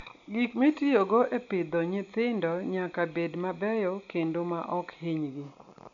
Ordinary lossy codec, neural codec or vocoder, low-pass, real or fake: none; none; 7.2 kHz; real